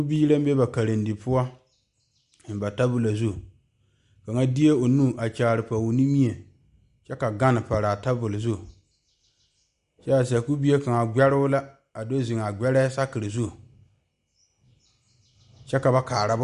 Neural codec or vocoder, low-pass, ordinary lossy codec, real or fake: none; 10.8 kHz; Opus, 64 kbps; real